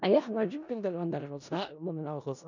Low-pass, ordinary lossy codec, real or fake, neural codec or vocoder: 7.2 kHz; none; fake; codec, 16 kHz in and 24 kHz out, 0.4 kbps, LongCat-Audio-Codec, four codebook decoder